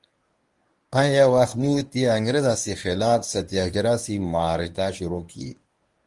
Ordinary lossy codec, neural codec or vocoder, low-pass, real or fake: Opus, 24 kbps; codec, 24 kHz, 0.9 kbps, WavTokenizer, medium speech release version 1; 10.8 kHz; fake